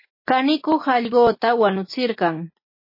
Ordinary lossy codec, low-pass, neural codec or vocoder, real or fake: MP3, 24 kbps; 5.4 kHz; none; real